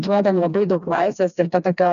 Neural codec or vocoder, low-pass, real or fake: codec, 16 kHz, 2 kbps, FreqCodec, smaller model; 7.2 kHz; fake